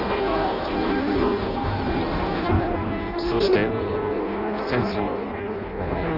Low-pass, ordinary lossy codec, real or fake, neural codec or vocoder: 5.4 kHz; none; fake; codec, 16 kHz in and 24 kHz out, 0.6 kbps, FireRedTTS-2 codec